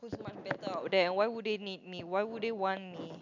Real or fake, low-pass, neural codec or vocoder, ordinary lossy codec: real; 7.2 kHz; none; none